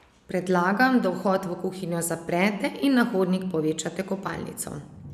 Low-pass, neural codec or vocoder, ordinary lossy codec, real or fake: 14.4 kHz; none; none; real